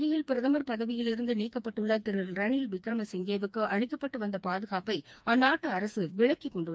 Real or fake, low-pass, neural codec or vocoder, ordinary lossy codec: fake; none; codec, 16 kHz, 2 kbps, FreqCodec, smaller model; none